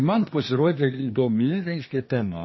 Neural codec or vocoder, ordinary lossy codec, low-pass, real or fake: codec, 24 kHz, 1 kbps, SNAC; MP3, 24 kbps; 7.2 kHz; fake